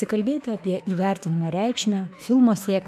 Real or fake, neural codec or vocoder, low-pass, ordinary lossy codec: fake; autoencoder, 48 kHz, 32 numbers a frame, DAC-VAE, trained on Japanese speech; 14.4 kHz; MP3, 96 kbps